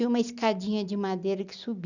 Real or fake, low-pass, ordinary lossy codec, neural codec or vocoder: real; 7.2 kHz; none; none